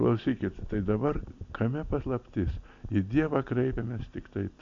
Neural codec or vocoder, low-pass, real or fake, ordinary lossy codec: none; 7.2 kHz; real; MP3, 64 kbps